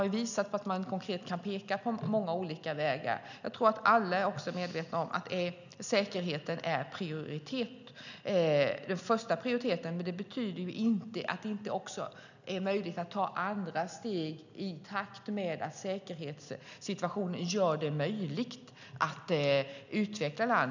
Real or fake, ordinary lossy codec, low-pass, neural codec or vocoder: real; none; 7.2 kHz; none